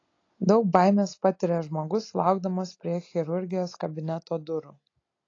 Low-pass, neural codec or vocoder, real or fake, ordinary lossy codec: 7.2 kHz; none; real; AAC, 32 kbps